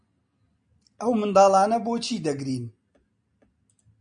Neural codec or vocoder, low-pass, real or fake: none; 9.9 kHz; real